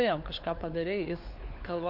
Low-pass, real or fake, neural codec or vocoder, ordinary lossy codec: 5.4 kHz; fake; codec, 16 kHz, 4 kbps, FunCodec, trained on LibriTTS, 50 frames a second; MP3, 48 kbps